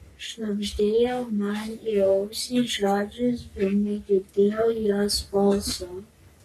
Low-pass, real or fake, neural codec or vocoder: 14.4 kHz; fake; codec, 44.1 kHz, 3.4 kbps, Pupu-Codec